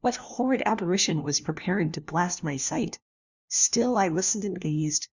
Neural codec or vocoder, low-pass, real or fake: codec, 16 kHz, 1 kbps, FunCodec, trained on LibriTTS, 50 frames a second; 7.2 kHz; fake